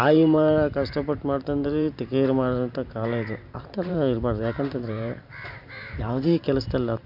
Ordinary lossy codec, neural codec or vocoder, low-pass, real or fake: none; vocoder, 44.1 kHz, 128 mel bands every 512 samples, BigVGAN v2; 5.4 kHz; fake